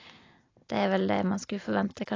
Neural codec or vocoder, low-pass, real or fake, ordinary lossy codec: none; 7.2 kHz; real; AAC, 32 kbps